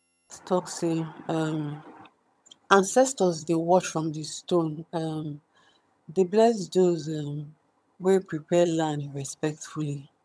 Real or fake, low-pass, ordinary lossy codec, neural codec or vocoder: fake; none; none; vocoder, 22.05 kHz, 80 mel bands, HiFi-GAN